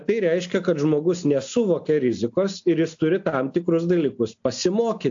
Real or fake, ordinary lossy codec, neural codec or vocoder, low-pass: real; AAC, 64 kbps; none; 7.2 kHz